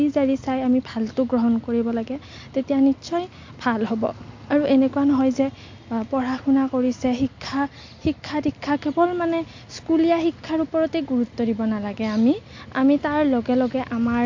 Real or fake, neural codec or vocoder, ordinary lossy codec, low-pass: real; none; MP3, 48 kbps; 7.2 kHz